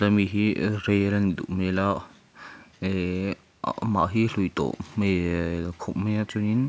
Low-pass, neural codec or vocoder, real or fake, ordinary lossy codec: none; none; real; none